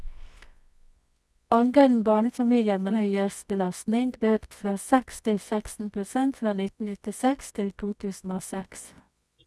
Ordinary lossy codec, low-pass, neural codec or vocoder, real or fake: none; none; codec, 24 kHz, 0.9 kbps, WavTokenizer, medium music audio release; fake